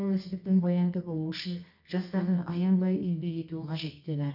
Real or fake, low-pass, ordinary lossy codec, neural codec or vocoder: fake; 5.4 kHz; none; codec, 24 kHz, 0.9 kbps, WavTokenizer, medium music audio release